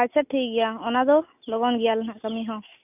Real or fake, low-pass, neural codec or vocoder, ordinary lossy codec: real; 3.6 kHz; none; none